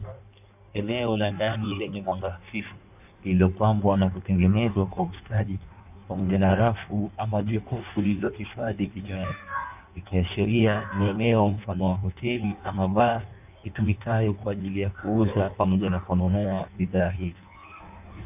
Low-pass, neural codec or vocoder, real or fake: 3.6 kHz; codec, 16 kHz in and 24 kHz out, 1.1 kbps, FireRedTTS-2 codec; fake